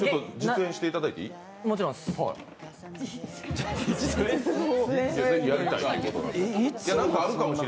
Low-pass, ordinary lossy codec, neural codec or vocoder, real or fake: none; none; none; real